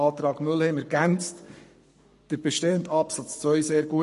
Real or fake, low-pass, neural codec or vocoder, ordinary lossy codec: fake; 14.4 kHz; codec, 44.1 kHz, 7.8 kbps, Pupu-Codec; MP3, 48 kbps